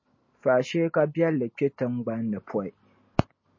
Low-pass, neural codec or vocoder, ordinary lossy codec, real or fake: 7.2 kHz; none; MP3, 32 kbps; real